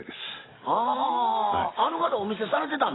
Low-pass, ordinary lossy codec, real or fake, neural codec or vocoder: 7.2 kHz; AAC, 16 kbps; real; none